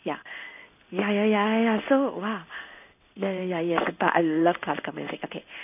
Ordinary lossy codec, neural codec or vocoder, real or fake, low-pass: none; codec, 16 kHz in and 24 kHz out, 1 kbps, XY-Tokenizer; fake; 3.6 kHz